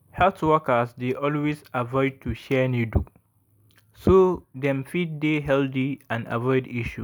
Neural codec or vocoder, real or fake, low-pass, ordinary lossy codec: none; real; none; none